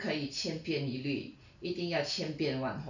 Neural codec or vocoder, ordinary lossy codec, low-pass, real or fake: none; none; 7.2 kHz; real